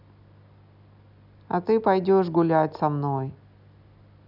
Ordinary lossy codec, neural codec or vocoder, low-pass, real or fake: none; none; 5.4 kHz; real